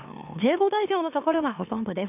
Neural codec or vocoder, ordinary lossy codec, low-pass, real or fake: autoencoder, 44.1 kHz, a latent of 192 numbers a frame, MeloTTS; none; 3.6 kHz; fake